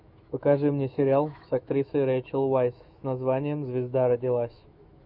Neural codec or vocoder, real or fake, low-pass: autoencoder, 48 kHz, 128 numbers a frame, DAC-VAE, trained on Japanese speech; fake; 5.4 kHz